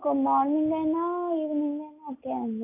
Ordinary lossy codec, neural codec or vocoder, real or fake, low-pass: none; none; real; 3.6 kHz